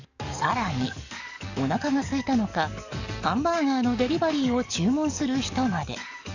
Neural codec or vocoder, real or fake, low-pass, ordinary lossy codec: codec, 44.1 kHz, 7.8 kbps, DAC; fake; 7.2 kHz; none